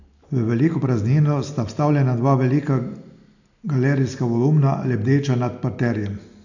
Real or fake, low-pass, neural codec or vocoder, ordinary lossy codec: real; 7.2 kHz; none; none